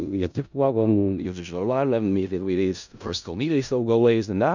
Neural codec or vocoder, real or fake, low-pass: codec, 16 kHz in and 24 kHz out, 0.4 kbps, LongCat-Audio-Codec, four codebook decoder; fake; 7.2 kHz